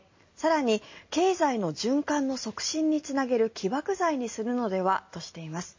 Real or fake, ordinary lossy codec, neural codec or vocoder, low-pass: real; MP3, 32 kbps; none; 7.2 kHz